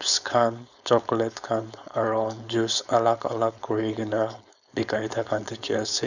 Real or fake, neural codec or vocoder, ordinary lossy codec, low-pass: fake; codec, 16 kHz, 4.8 kbps, FACodec; none; 7.2 kHz